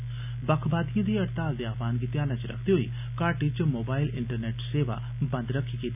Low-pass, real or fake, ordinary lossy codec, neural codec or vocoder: 3.6 kHz; real; none; none